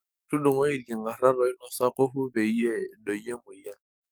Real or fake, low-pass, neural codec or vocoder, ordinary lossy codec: fake; none; codec, 44.1 kHz, 7.8 kbps, DAC; none